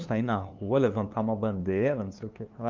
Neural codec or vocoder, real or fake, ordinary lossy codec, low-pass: codec, 16 kHz, 2 kbps, FunCodec, trained on LibriTTS, 25 frames a second; fake; Opus, 32 kbps; 7.2 kHz